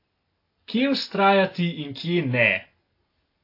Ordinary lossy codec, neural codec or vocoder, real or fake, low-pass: AAC, 32 kbps; none; real; 5.4 kHz